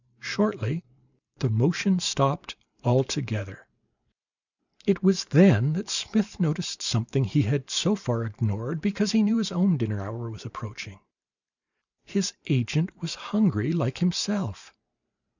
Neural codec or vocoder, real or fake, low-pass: vocoder, 44.1 kHz, 128 mel bands every 512 samples, BigVGAN v2; fake; 7.2 kHz